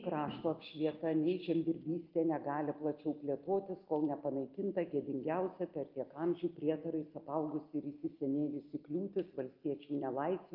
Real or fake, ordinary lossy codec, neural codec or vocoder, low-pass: real; Opus, 24 kbps; none; 5.4 kHz